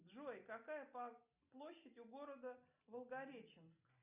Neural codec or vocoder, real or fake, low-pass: none; real; 3.6 kHz